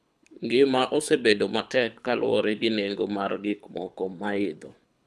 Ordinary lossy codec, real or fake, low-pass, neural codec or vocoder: none; fake; none; codec, 24 kHz, 6 kbps, HILCodec